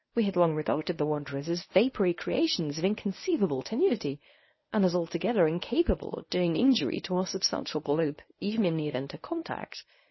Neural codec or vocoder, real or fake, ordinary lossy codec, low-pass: codec, 24 kHz, 0.9 kbps, WavTokenizer, medium speech release version 1; fake; MP3, 24 kbps; 7.2 kHz